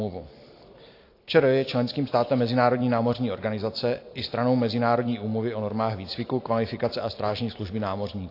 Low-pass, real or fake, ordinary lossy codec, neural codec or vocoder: 5.4 kHz; real; AAC, 32 kbps; none